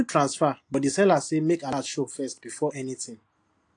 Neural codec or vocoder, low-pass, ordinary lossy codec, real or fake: none; 9.9 kHz; AAC, 48 kbps; real